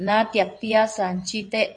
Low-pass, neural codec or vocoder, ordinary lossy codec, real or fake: 9.9 kHz; vocoder, 22.05 kHz, 80 mel bands, WaveNeXt; MP3, 48 kbps; fake